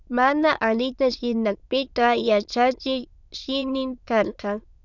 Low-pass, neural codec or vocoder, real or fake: 7.2 kHz; autoencoder, 22.05 kHz, a latent of 192 numbers a frame, VITS, trained on many speakers; fake